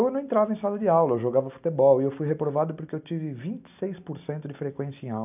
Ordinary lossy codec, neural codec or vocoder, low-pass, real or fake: none; none; 3.6 kHz; real